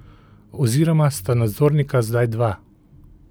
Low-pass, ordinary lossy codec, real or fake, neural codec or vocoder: none; none; real; none